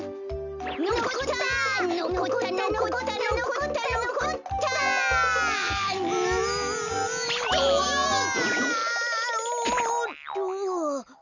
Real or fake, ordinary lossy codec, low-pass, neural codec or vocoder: real; none; 7.2 kHz; none